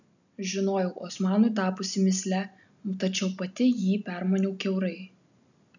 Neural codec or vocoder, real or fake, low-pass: none; real; 7.2 kHz